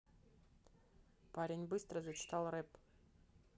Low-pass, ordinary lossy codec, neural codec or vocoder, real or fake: none; none; none; real